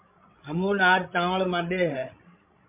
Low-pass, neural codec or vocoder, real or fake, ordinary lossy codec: 3.6 kHz; codec, 16 kHz, 16 kbps, FreqCodec, larger model; fake; MP3, 32 kbps